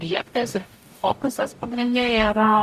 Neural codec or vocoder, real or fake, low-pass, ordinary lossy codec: codec, 44.1 kHz, 0.9 kbps, DAC; fake; 14.4 kHz; Opus, 64 kbps